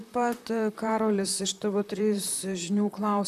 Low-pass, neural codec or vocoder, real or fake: 14.4 kHz; vocoder, 44.1 kHz, 128 mel bands, Pupu-Vocoder; fake